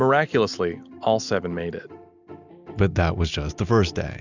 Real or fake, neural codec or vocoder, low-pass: real; none; 7.2 kHz